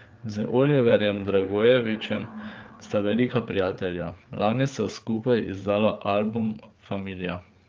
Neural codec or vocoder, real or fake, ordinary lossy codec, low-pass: codec, 16 kHz, 4 kbps, FreqCodec, larger model; fake; Opus, 24 kbps; 7.2 kHz